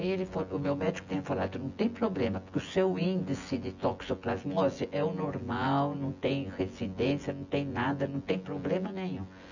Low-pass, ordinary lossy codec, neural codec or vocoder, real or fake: 7.2 kHz; none; vocoder, 24 kHz, 100 mel bands, Vocos; fake